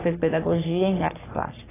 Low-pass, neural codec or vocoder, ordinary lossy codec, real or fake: 3.6 kHz; codec, 44.1 kHz, 3.4 kbps, Pupu-Codec; AAC, 16 kbps; fake